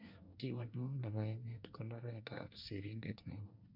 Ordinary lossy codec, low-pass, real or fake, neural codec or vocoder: MP3, 48 kbps; 5.4 kHz; fake; codec, 24 kHz, 1 kbps, SNAC